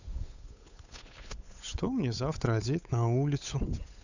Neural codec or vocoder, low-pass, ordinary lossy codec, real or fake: codec, 16 kHz, 8 kbps, FunCodec, trained on Chinese and English, 25 frames a second; 7.2 kHz; none; fake